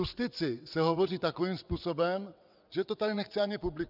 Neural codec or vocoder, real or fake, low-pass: vocoder, 22.05 kHz, 80 mel bands, WaveNeXt; fake; 5.4 kHz